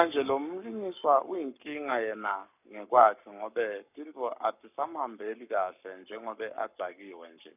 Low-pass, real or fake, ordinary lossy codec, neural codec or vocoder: 3.6 kHz; fake; none; codec, 44.1 kHz, 7.8 kbps, Pupu-Codec